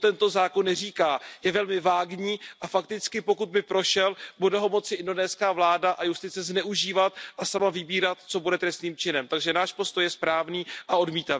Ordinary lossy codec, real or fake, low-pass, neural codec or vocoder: none; real; none; none